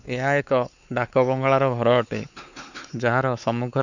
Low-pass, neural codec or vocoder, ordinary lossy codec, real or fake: 7.2 kHz; codec, 16 kHz, 4 kbps, X-Codec, WavLM features, trained on Multilingual LibriSpeech; none; fake